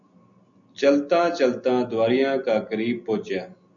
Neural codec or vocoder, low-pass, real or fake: none; 7.2 kHz; real